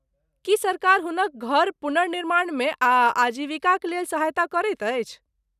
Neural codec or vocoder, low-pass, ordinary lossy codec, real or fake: none; 14.4 kHz; none; real